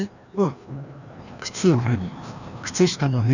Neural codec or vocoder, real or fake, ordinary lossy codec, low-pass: codec, 16 kHz, 1 kbps, FreqCodec, larger model; fake; none; 7.2 kHz